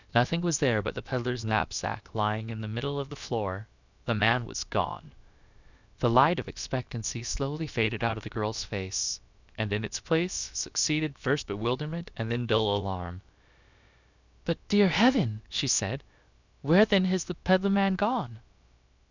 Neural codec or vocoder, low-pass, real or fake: codec, 16 kHz, about 1 kbps, DyCAST, with the encoder's durations; 7.2 kHz; fake